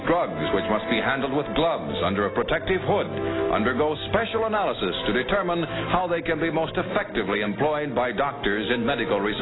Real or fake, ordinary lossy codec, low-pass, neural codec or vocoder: real; AAC, 16 kbps; 7.2 kHz; none